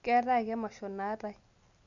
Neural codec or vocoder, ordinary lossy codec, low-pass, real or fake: none; none; 7.2 kHz; real